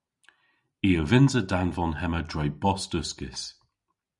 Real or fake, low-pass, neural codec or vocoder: real; 10.8 kHz; none